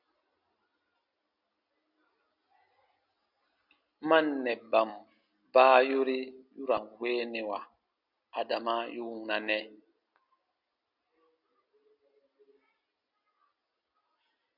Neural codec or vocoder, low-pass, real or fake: none; 5.4 kHz; real